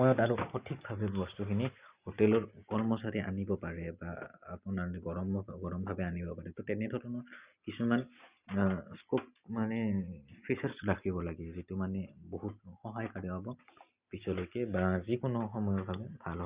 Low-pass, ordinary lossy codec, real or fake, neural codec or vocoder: 3.6 kHz; Opus, 64 kbps; real; none